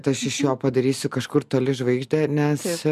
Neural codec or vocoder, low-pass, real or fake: none; 14.4 kHz; real